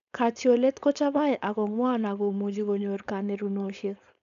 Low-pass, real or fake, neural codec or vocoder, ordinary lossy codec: 7.2 kHz; fake; codec, 16 kHz, 4.8 kbps, FACodec; AAC, 96 kbps